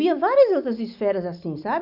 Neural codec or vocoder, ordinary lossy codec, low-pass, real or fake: none; none; 5.4 kHz; real